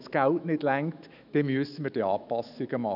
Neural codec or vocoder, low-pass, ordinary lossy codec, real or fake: autoencoder, 48 kHz, 128 numbers a frame, DAC-VAE, trained on Japanese speech; 5.4 kHz; none; fake